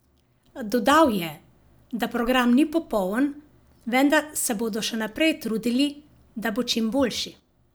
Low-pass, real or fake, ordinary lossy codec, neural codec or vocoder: none; real; none; none